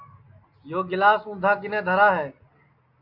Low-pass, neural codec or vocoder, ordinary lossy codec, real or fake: 5.4 kHz; none; MP3, 48 kbps; real